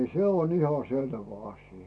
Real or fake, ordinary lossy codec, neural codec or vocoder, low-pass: real; none; none; none